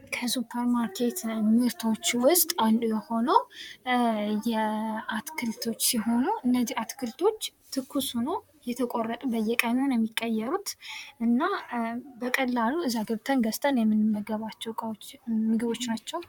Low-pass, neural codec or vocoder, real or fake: 19.8 kHz; vocoder, 44.1 kHz, 128 mel bands, Pupu-Vocoder; fake